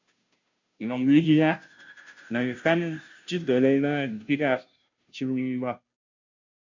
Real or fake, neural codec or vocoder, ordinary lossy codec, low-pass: fake; codec, 16 kHz, 0.5 kbps, FunCodec, trained on Chinese and English, 25 frames a second; MP3, 64 kbps; 7.2 kHz